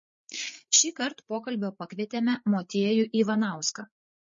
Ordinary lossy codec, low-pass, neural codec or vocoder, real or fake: MP3, 32 kbps; 7.2 kHz; codec, 16 kHz, 8 kbps, FreqCodec, larger model; fake